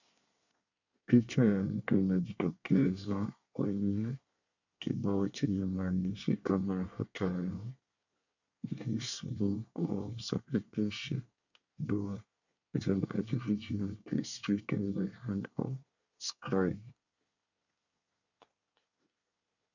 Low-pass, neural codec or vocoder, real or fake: 7.2 kHz; codec, 24 kHz, 1 kbps, SNAC; fake